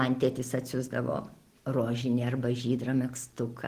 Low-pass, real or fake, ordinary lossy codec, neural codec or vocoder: 14.4 kHz; real; Opus, 16 kbps; none